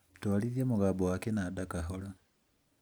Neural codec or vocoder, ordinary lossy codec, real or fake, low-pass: none; none; real; none